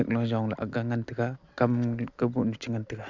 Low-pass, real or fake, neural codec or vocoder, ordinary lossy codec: 7.2 kHz; real; none; MP3, 64 kbps